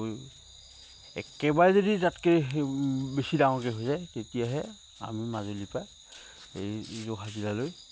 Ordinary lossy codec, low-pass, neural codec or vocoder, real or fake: none; none; none; real